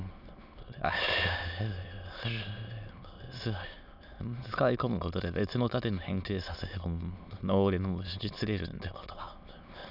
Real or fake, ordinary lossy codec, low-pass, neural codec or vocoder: fake; none; 5.4 kHz; autoencoder, 22.05 kHz, a latent of 192 numbers a frame, VITS, trained on many speakers